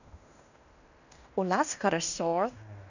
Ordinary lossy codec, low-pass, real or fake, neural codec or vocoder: none; 7.2 kHz; fake; codec, 16 kHz in and 24 kHz out, 0.9 kbps, LongCat-Audio-Codec, fine tuned four codebook decoder